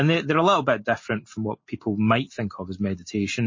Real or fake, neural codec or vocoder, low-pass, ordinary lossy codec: real; none; 7.2 kHz; MP3, 32 kbps